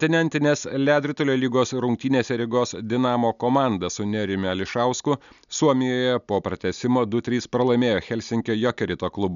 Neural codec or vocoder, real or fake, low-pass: none; real; 7.2 kHz